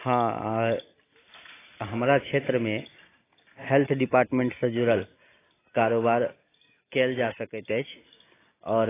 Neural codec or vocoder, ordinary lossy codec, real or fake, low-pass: none; AAC, 16 kbps; real; 3.6 kHz